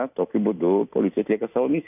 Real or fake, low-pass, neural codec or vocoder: fake; 3.6 kHz; vocoder, 44.1 kHz, 128 mel bands every 256 samples, BigVGAN v2